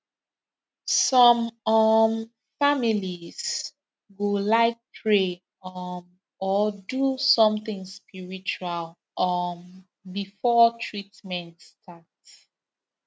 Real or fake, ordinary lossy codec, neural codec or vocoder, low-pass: real; none; none; none